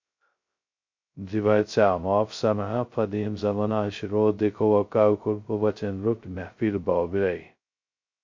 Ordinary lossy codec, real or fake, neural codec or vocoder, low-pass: MP3, 48 kbps; fake; codec, 16 kHz, 0.2 kbps, FocalCodec; 7.2 kHz